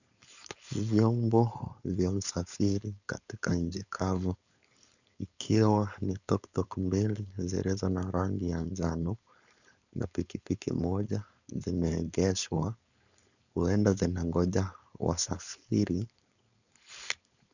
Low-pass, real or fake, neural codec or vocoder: 7.2 kHz; fake; codec, 16 kHz, 4.8 kbps, FACodec